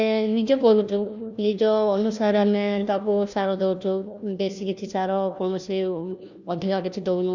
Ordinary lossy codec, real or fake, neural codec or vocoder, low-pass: none; fake; codec, 16 kHz, 1 kbps, FunCodec, trained on LibriTTS, 50 frames a second; 7.2 kHz